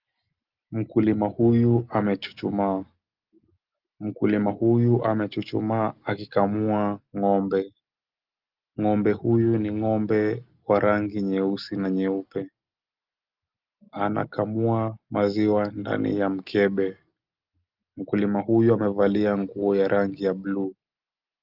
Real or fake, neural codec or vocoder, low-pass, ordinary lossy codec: real; none; 5.4 kHz; Opus, 32 kbps